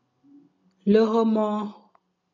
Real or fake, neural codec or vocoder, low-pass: real; none; 7.2 kHz